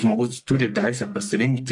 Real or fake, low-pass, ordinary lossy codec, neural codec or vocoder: fake; 10.8 kHz; MP3, 96 kbps; codec, 44.1 kHz, 1.7 kbps, Pupu-Codec